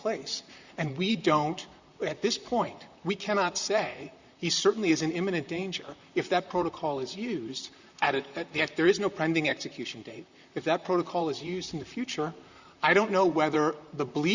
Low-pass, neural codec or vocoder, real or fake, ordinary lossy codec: 7.2 kHz; vocoder, 44.1 kHz, 128 mel bands, Pupu-Vocoder; fake; Opus, 64 kbps